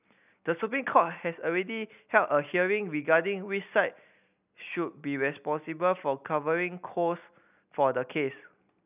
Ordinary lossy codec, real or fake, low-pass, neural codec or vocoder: none; real; 3.6 kHz; none